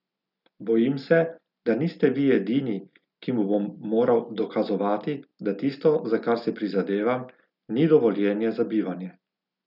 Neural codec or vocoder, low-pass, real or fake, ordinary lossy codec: none; 5.4 kHz; real; none